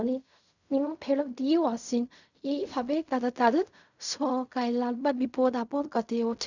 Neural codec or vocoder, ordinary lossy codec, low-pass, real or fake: codec, 16 kHz in and 24 kHz out, 0.4 kbps, LongCat-Audio-Codec, fine tuned four codebook decoder; none; 7.2 kHz; fake